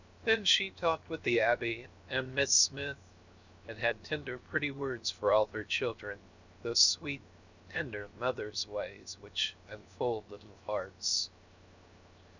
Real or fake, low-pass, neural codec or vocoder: fake; 7.2 kHz; codec, 16 kHz, 0.7 kbps, FocalCodec